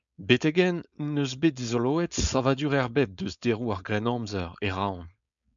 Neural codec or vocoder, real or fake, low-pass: codec, 16 kHz, 4.8 kbps, FACodec; fake; 7.2 kHz